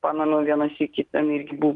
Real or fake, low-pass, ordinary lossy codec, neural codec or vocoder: real; 10.8 kHz; Opus, 24 kbps; none